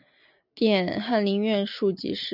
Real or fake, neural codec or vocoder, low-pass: real; none; 5.4 kHz